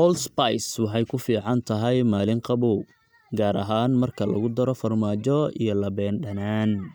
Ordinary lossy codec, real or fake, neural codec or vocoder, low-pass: none; real; none; none